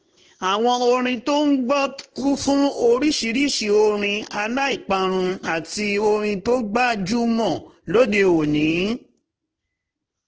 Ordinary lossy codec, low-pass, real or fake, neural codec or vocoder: Opus, 16 kbps; 7.2 kHz; fake; codec, 16 kHz in and 24 kHz out, 1 kbps, XY-Tokenizer